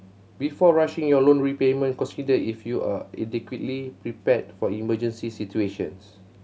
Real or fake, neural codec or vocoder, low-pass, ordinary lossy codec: real; none; none; none